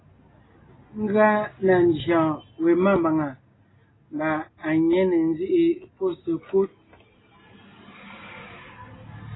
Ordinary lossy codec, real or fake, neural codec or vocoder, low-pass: AAC, 16 kbps; real; none; 7.2 kHz